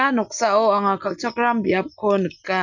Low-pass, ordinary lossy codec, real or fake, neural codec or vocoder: 7.2 kHz; none; real; none